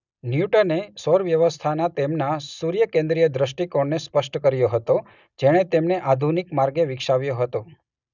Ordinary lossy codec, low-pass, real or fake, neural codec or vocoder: none; 7.2 kHz; real; none